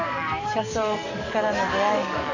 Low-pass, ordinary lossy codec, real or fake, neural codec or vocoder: 7.2 kHz; none; fake; codec, 44.1 kHz, 7.8 kbps, DAC